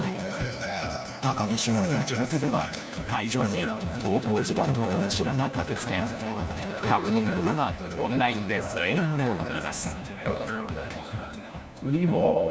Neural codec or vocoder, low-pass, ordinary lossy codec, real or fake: codec, 16 kHz, 1 kbps, FunCodec, trained on LibriTTS, 50 frames a second; none; none; fake